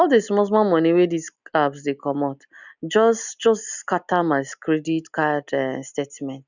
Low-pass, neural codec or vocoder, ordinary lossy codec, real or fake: 7.2 kHz; none; none; real